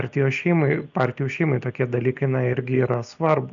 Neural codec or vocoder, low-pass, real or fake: none; 7.2 kHz; real